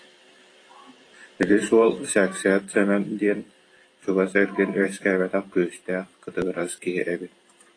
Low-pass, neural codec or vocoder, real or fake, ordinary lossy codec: 9.9 kHz; vocoder, 44.1 kHz, 128 mel bands every 512 samples, BigVGAN v2; fake; AAC, 32 kbps